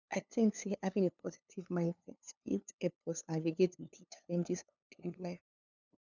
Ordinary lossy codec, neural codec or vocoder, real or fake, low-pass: none; codec, 16 kHz, 2 kbps, FunCodec, trained on LibriTTS, 25 frames a second; fake; 7.2 kHz